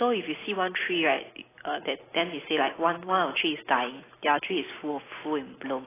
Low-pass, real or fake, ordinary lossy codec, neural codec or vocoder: 3.6 kHz; real; AAC, 16 kbps; none